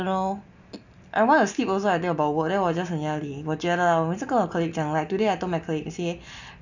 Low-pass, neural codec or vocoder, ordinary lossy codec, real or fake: 7.2 kHz; none; none; real